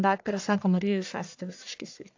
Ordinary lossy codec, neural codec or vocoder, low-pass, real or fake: AAC, 48 kbps; codec, 44.1 kHz, 1.7 kbps, Pupu-Codec; 7.2 kHz; fake